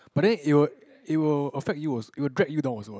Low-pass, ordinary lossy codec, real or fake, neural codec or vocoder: none; none; real; none